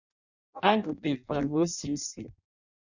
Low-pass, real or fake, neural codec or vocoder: 7.2 kHz; fake; codec, 16 kHz in and 24 kHz out, 0.6 kbps, FireRedTTS-2 codec